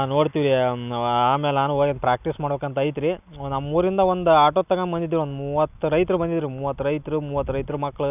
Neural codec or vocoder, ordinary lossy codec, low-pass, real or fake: none; none; 3.6 kHz; real